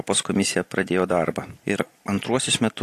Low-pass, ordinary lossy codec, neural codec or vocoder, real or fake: 14.4 kHz; AAC, 48 kbps; none; real